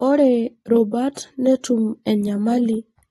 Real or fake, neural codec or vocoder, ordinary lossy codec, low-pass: real; none; AAC, 32 kbps; 19.8 kHz